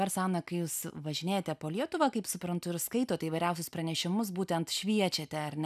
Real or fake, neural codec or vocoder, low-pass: real; none; 14.4 kHz